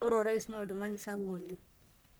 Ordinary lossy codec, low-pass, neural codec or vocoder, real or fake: none; none; codec, 44.1 kHz, 1.7 kbps, Pupu-Codec; fake